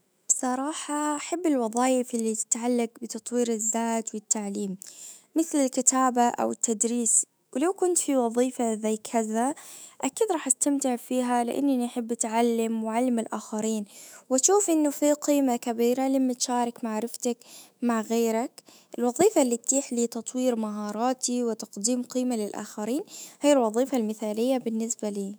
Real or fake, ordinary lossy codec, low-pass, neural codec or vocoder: fake; none; none; autoencoder, 48 kHz, 128 numbers a frame, DAC-VAE, trained on Japanese speech